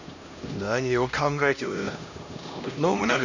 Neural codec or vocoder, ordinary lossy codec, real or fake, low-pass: codec, 16 kHz, 1 kbps, X-Codec, HuBERT features, trained on LibriSpeech; none; fake; 7.2 kHz